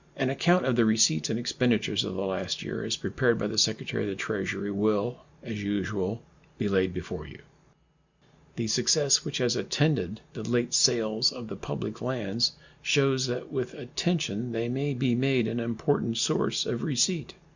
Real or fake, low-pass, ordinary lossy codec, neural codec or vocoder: real; 7.2 kHz; Opus, 64 kbps; none